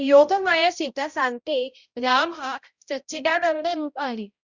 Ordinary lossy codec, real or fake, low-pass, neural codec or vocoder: Opus, 64 kbps; fake; 7.2 kHz; codec, 16 kHz, 0.5 kbps, X-Codec, HuBERT features, trained on balanced general audio